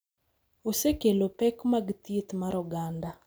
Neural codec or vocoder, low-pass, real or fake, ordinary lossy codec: none; none; real; none